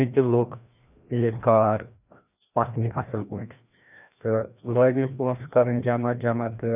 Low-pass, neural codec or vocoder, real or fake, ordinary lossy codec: 3.6 kHz; codec, 16 kHz, 1 kbps, FreqCodec, larger model; fake; none